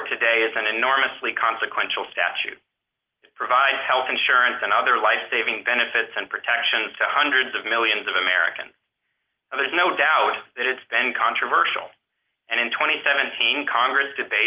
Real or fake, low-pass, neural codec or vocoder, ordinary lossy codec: real; 3.6 kHz; none; Opus, 16 kbps